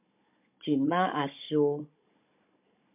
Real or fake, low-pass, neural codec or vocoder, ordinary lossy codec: fake; 3.6 kHz; codec, 16 kHz, 16 kbps, FunCodec, trained on Chinese and English, 50 frames a second; MP3, 32 kbps